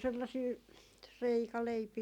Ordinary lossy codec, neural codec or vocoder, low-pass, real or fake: none; none; 19.8 kHz; real